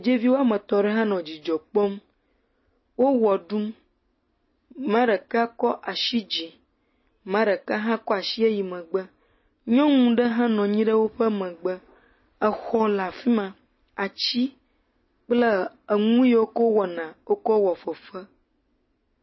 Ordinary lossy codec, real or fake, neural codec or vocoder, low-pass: MP3, 24 kbps; real; none; 7.2 kHz